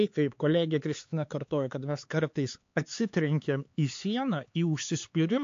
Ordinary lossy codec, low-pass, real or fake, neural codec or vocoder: AAC, 48 kbps; 7.2 kHz; fake; codec, 16 kHz, 4 kbps, X-Codec, HuBERT features, trained on LibriSpeech